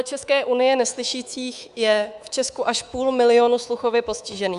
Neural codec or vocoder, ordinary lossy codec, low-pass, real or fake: codec, 24 kHz, 3.1 kbps, DualCodec; Opus, 64 kbps; 10.8 kHz; fake